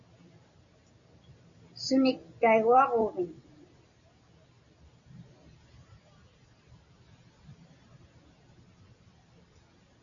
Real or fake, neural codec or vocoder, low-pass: real; none; 7.2 kHz